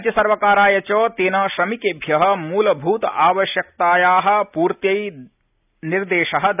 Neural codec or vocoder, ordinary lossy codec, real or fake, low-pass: none; none; real; 3.6 kHz